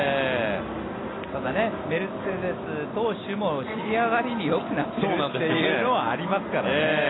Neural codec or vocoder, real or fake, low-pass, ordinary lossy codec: none; real; 7.2 kHz; AAC, 16 kbps